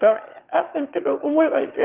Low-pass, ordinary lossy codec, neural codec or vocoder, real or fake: 3.6 kHz; Opus, 32 kbps; autoencoder, 22.05 kHz, a latent of 192 numbers a frame, VITS, trained on one speaker; fake